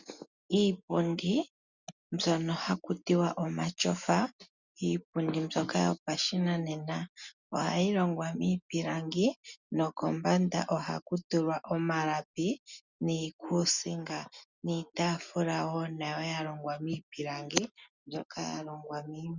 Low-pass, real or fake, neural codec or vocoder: 7.2 kHz; real; none